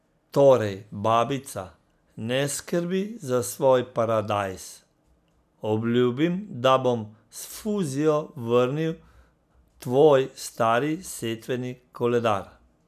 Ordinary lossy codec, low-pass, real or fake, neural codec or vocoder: none; 14.4 kHz; real; none